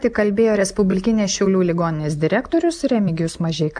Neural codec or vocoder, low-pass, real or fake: none; 9.9 kHz; real